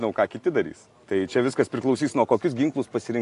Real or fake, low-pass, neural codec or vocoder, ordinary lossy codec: real; 10.8 kHz; none; AAC, 48 kbps